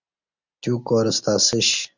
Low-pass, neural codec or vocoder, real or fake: 7.2 kHz; none; real